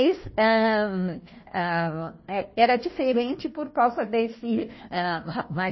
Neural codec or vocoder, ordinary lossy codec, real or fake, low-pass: codec, 16 kHz, 1 kbps, FunCodec, trained on Chinese and English, 50 frames a second; MP3, 24 kbps; fake; 7.2 kHz